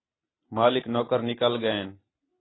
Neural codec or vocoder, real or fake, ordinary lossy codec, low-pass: none; real; AAC, 16 kbps; 7.2 kHz